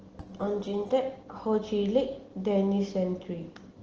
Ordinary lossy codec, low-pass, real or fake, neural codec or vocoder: Opus, 16 kbps; 7.2 kHz; real; none